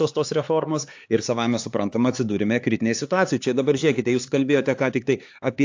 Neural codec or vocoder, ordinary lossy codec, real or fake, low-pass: codec, 16 kHz, 4 kbps, X-Codec, HuBERT features, trained on LibriSpeech; AAC, 48 kbps; fake; 7.2 kHz